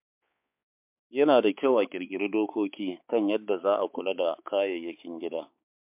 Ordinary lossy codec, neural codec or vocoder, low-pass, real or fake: MP3, 32 kbps; codec, 16 kHz, 4 kbps, X-Codec, HuBERT features, trained on balanced general audio; 3.6 kHz; fake